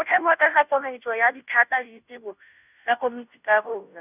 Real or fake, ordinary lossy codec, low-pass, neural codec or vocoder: fake; none; 3.6 kHz; codec, 16 kHz, 0.5 kbps, FunCodec, trained on Chinese and English, 25 frames a second